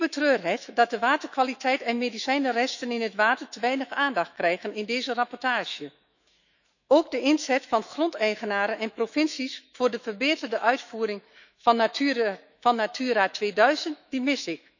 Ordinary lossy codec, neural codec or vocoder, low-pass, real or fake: none; autoencoder, 48 kHz, 128 numbers a frame, DAC-VAE, trained on Japanese speech; 7.2 kHz; fake